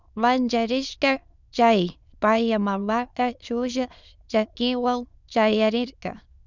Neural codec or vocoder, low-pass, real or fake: autoencoder, 22.05 kHz, a latent of 192 numbers a frame, VITS, trained on many speakers; 7.2 kHz; fake